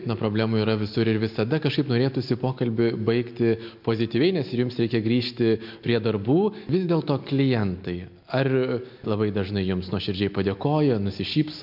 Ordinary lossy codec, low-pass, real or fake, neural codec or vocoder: MP3, 48 kbps; 5.4 kHz; real; none